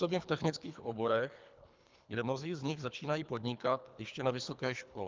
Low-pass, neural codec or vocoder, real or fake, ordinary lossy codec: 7.2 kHz; codec, 24 kHz, 3 kbps, HILCodec; fake; Opus, 24 kbps